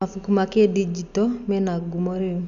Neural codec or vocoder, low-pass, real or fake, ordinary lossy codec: none; 7.2 kHz; real; none